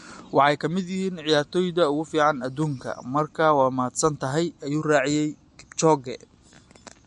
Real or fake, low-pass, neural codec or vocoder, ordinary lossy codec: real; 14.4 kHz; none; MP3, 48 kbps